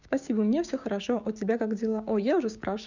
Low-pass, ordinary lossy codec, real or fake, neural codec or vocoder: 7.2 kHz; none; fake; codec, 16 kHz, 6 kbps, DAC